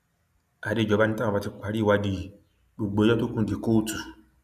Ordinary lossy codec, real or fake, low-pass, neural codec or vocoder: none; real; 14.4 kHz; none